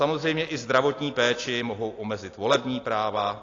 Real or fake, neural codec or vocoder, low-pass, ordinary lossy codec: real; none; 7.2 kHz; AAC, 32 kbps